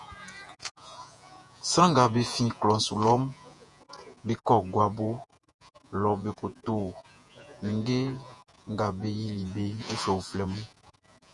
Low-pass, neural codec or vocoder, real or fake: 10.8 kHz; vocoder, 48 kHz, 128 mel bands, Vocos; fake